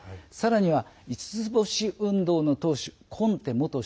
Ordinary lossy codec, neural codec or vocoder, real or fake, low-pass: none; none; real; none